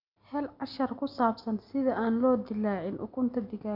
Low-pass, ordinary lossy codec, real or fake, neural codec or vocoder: 5.4 kHz; none; real; none